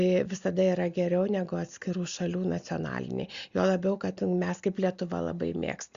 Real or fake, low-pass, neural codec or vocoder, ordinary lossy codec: real; 7.2 kHz; none; Opus, 64 kbps